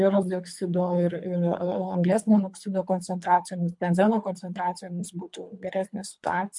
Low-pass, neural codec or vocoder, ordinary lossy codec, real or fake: 10.8 kHz; codec, 24 kHz, 3 kbps, HILCodec; MP3, 64 kbps; fake